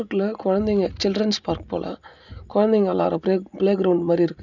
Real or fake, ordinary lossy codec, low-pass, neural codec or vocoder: real; none; 7.2 kHz; none